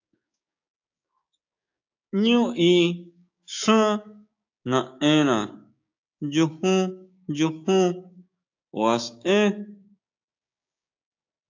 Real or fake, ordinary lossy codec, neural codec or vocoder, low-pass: fake; AAC, 48 kbps; codec, 16 kHz, 6 kbps, DAC; 7.2 kHz